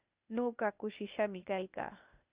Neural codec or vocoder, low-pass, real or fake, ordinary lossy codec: codec, 16 kHz, 0.8 kbps, ZipCodec; 3.6 kHz; fake; Opus, 64 kbps